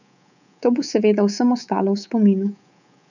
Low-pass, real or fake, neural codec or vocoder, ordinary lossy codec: 7.2 kHz; fake; codec, 24 kHz, 3.1 kbps, DualCodec; none